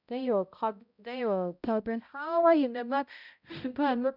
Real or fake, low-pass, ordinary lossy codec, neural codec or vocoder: fake; 5.4 kHz; none; codec, 16 kHz, 0.5 kbps, X-Codec, HuBERT features, trained on balanced general audio